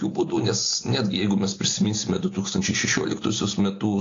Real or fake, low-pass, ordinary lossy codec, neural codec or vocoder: real; 7.2 kHz; AAC, 32 kbps; none